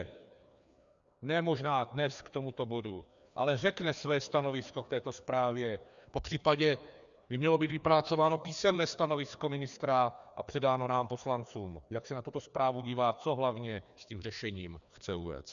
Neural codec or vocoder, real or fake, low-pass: codec, 16 kHz, 2 kbps, FreqCodec, larger model; fake; 7.2 kHz